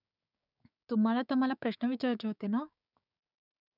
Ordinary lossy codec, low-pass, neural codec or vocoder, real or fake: none; 5.4 kHz; codec, 16 kHz, 6 kbps, DAC; fake